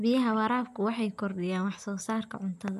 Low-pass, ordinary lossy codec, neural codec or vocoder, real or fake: 14.4 kHz; none; none; real